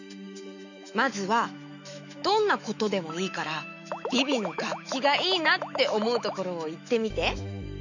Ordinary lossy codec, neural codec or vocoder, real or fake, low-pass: none; autoencoder, 48 kHz, 128 numbers a frame, DAC-VAE, trained on Japanese speech; fake; 7.2 kHz